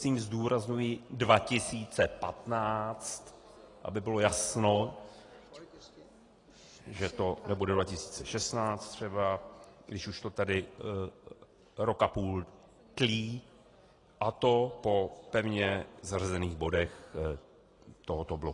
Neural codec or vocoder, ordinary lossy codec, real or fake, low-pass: none; AAC, 32 kbps; real; 10.8 kHz